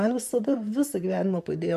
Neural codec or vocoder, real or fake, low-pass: vocoder, 44.1 kHz, 128 mel bands, Pupu-Vocoder; fake; 14.4 kHz